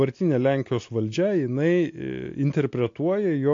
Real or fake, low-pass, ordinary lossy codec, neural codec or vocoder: real; 7.2 kHz; AAC, 48 kbps; none